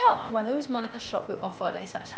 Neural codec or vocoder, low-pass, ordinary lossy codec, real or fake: codec, 16 kHz, 0.8 kbps, ZipCodec; none; none; fake